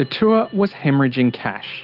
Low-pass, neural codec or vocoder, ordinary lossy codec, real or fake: 5.4 kHz; none; Opus, 32 kbps; real